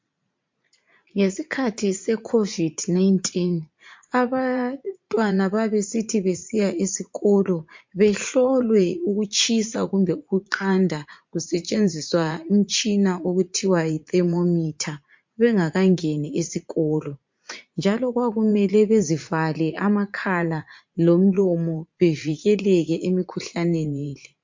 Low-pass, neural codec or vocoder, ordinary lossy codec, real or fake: 7.2 kHz; vocoder, 44.1 kHz, 80 mel bands, Vocos; MP3, 48 kbps; fake